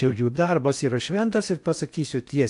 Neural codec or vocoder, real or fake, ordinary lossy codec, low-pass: codec, 16 kHz in and 24 kHz out, 0.8 kbps, FocalCodec, streaming, 65536 codes; fake; AAC, 64 kbps; 10.8 kHz